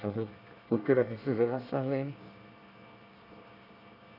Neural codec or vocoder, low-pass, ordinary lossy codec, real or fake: codec, 24 kHz, 1 kbps, SNAC; 5.4 kHz; none; fake